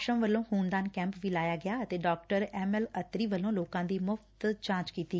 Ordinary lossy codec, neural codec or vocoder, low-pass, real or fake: none; none; none; real